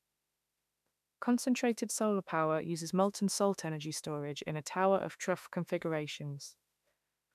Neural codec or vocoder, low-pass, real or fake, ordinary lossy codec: autoencoder, 48 kHz, 32 numbers a frame, DAC-VAE, trained on Japanese speech; 14.4 kHz; fake; none